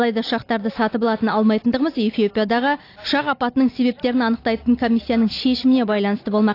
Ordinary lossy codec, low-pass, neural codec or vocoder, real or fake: AAC, 32 kbps; 5.4 kHz; none; real